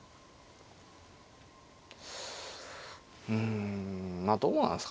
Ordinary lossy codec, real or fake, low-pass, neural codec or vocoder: none; real; none; none